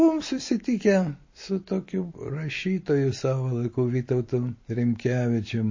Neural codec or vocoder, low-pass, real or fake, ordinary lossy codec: none; 7.2 kHz; real; MP3, 32 kbps